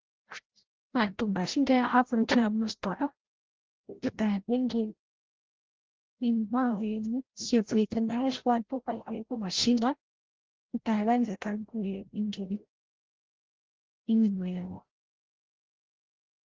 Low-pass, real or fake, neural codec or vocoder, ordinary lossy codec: 7.2 kHz; fake; codec, 16 kHz, 0.5 kbps, FreqCodec, larger model; Opus, 16 kbps